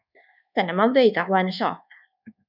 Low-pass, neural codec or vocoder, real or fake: 5.4 kHz; codec, 24 kHz, 1.2 kbps, DualCodec; fake